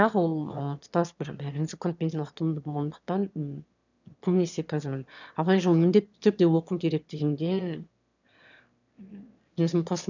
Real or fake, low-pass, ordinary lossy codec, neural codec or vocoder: fake; 7.2 kHz; none; autoencoder, 22.05 kHz, a latent of 192 numbers a frame, VITS, trained on one speaker